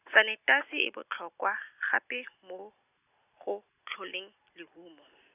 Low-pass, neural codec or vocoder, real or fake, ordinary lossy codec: 3.6 kHz; none; real; none